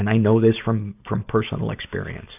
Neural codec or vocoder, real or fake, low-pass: none; real; 3.6 kHz